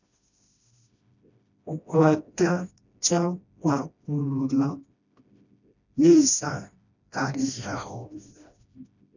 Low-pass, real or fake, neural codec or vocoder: 7.2 kHz; fake; codec, 16 kHz, 1 kbps, FreqCodec, smaller model